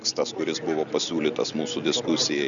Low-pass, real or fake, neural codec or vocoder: 7.2 kHz; real; none